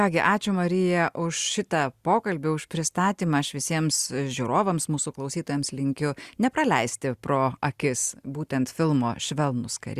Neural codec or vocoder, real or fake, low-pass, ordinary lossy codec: none; real; 14.4 kHz; Opus, 64 kbps